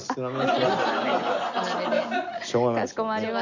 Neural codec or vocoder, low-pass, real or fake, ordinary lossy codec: none; 7.2 kHz; real; none